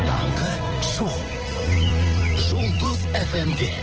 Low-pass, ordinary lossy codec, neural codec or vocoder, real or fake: 7.2 kHz; Opus, 16 kbps; vocoder, 44.1 kHz, 80 mel bands, Vocos; fake